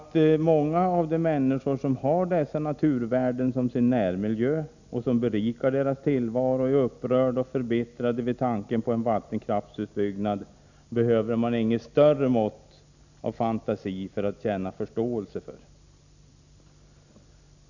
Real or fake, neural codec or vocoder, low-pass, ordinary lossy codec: real; none; 7.2 kHz; none